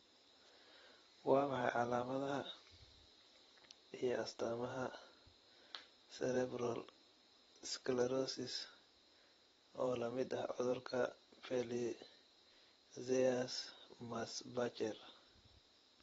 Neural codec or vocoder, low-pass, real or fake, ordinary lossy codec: vocoder, 44.1 kHz, 128 mel bands every 512 samples, BigVGAN v2; 19.8 kHz; fake; AAC, 24 kbps